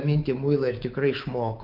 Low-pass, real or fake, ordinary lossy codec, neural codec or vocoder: 5.4 kHz; fake; Opus, 32 kbps; codec, 24 kHz, 3.1 kbps, DualCodec